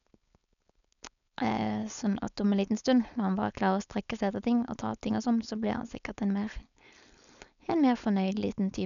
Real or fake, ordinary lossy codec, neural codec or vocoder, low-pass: fake; none; codec, 16 kHz, 4.8 kbps, FACodec; 7.2 kHz